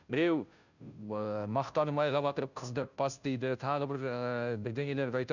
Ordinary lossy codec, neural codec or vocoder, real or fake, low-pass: none; codec, 16 kHz, 0.5 kbps, FunCodec, trained on Chinese and English, 25 frames a second; fake; 7.2 kHz